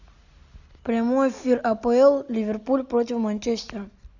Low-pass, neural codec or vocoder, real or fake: 7.2 kHz; none; real